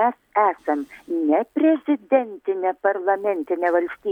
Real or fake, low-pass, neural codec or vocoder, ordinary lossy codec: real; 19.8 kHz; none; MP3, 96 kbps